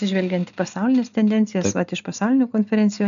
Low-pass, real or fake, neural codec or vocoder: 7.2 kHz; real; none